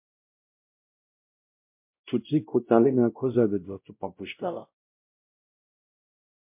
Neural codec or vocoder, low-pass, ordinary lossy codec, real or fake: codec, 16 kHz, 0.5 kbps, X-Codec, WavLM features, trained on Multilingual LibriSpeech; 3.6 kHz; MP3, 32 kbps; fake